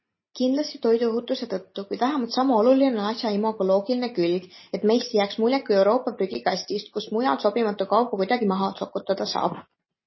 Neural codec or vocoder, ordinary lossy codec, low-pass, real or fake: none; MP3, 24 kbps; 7.2 kHz; real